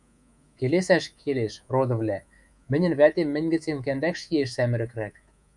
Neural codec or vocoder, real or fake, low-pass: codec, 24 kHz, 3.1 kbps, DualCodec; fake; 10.8 kHz